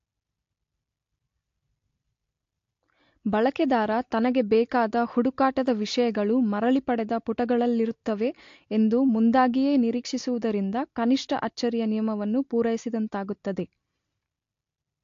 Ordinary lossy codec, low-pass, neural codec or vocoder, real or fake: AAC, 48 kbps; 7.2 kHz; none; real